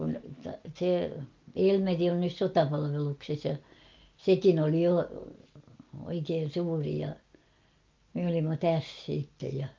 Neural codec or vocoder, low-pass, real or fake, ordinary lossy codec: none; 7.2 kHz; real; Opus, 24 kbps